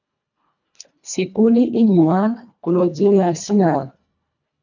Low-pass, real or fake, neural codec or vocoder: 7.2 kHz; fake; codec, 24 kHz, 1.5 kbps, HILCodec